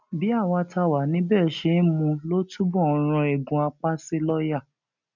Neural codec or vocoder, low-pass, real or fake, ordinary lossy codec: none; 7.2 kHz; real; none